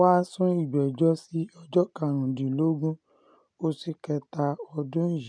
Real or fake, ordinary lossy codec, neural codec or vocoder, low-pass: real; none; none; 9.9 kHz